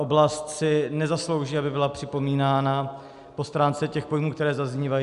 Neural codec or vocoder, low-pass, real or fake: none; 10.8 kHz; real